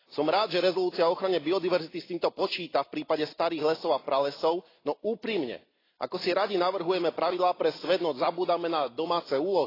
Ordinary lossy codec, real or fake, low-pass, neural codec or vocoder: AAC, 24 kbps; real; 5.4 kHz; none